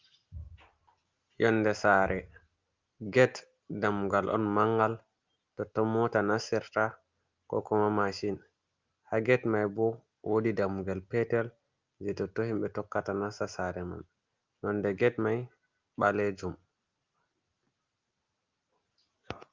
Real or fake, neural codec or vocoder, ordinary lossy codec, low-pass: real; none; Opus, 32 kbps; 7.2 kHz